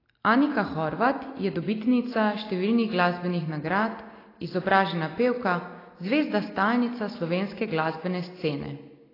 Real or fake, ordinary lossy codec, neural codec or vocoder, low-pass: real; AAC, 24 kbps; none; 5.4 kHz